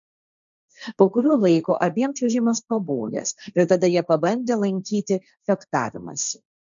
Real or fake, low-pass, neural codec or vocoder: fake; 7.2 kHz; codec, 16 kHz, 1.1 kbps, Voila-Tokenizer